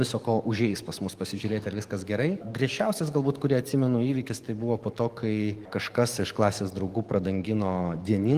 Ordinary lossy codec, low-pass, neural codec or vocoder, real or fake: Opus, 24 kbps; 14.4 kHz; codec, 44.1 kHz, 7.8 kbps, DAC; fake